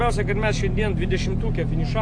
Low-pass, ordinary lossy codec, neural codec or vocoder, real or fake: 9.9 kHz; AAC, 64 kbps; none; real